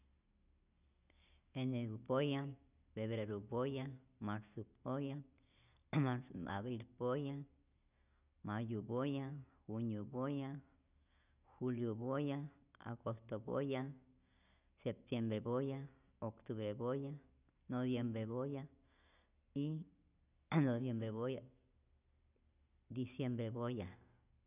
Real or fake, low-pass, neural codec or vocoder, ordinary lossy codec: real; 3.6 kHz; none; none